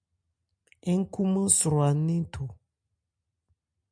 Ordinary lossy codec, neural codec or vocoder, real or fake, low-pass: MP3, 64 kbps; none; real; 9.9 kHz